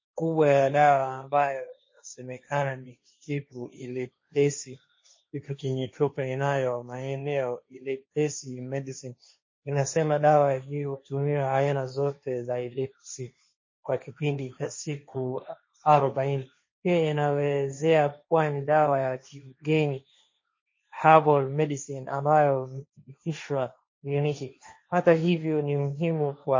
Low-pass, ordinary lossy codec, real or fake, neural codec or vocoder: 7.2 kHz; MP3, 32 kbps; fake; codec, 16 kHz, 1.1 kbps, Voila-Tokenizer